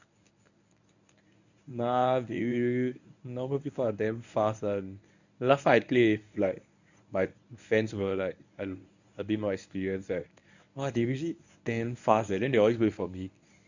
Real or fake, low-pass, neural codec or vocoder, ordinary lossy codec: fake; 7.2 kHz; codec, 24 kHz, 0.9 kbps, WavTokenizer, medium speech release version 1; none